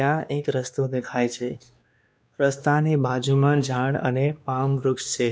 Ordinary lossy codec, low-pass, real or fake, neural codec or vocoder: none; none; fake; codec, 16 kHz, 2 kbps, X-Codec, WavLM features, trained on Multilingual LibriSpeech